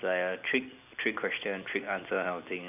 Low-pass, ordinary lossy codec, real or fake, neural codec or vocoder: 3.6 kHz; AAC, 32 kbps; real; none